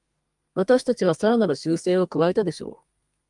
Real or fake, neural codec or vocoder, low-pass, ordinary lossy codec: fake; codec, 32 kHz, 1.9 kbps, SNAC; 10.8 kHz; Opus, 32 kbps